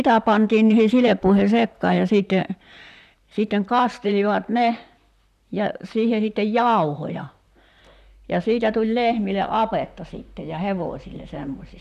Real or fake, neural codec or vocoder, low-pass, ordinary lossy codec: fake; vocoder, 44.1 kHz, 128 mel bands, Pupu-Vocoder; 14.4 kHz; none